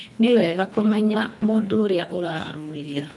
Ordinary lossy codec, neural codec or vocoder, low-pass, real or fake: none; codec, 24 kHz, 1.5 kbps, HILCodec; none; fake